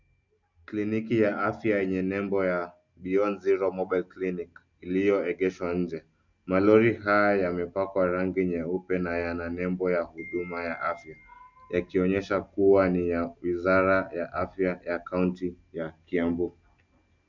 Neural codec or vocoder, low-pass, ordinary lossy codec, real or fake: none; 7.2 kHz; MP3, 64 kbps; real